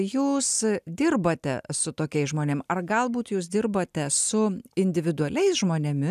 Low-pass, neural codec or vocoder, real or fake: 14.4 kHz; none; real